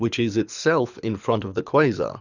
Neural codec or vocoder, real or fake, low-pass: codec, 24 kHz, 6 kbps, HILCodec; fake; 7.2 kHz